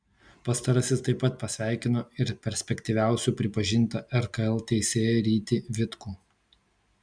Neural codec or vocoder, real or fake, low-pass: none; real; 9.9 kHz